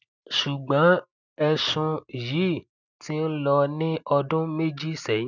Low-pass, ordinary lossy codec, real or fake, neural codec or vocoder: 7.2 kHz; none; real; none